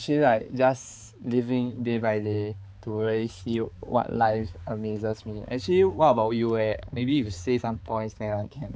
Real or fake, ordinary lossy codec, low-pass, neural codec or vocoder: fake; none; none; codec, 16 kHz, 4 kbps, X-Codec, HuBERT features, trained on balanced general audio